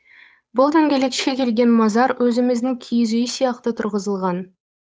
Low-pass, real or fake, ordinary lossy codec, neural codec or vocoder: none; fake; none; codec, 16 kHz, 8 kbps, FunCodec, trained on Chinese and English, 25 frames a second